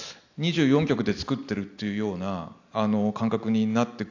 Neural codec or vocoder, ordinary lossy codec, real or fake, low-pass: none; AAC, 48 kbps; real; 7.2 kHz